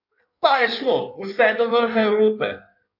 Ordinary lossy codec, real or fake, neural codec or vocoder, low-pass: AAC, 48 kbps; fake; codec, 16 kHz in and 24 kHz out, 1.1 kbps, FireRedTTS-2 codec; 5.4 kHz